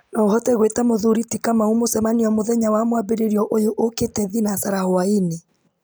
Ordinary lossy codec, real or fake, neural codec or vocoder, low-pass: none; real; none; none